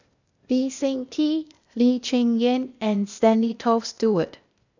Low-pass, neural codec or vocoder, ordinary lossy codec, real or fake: 7.2 kHz; codec, 16 kHz, 0.8 kbps, ZipCodec; none; fake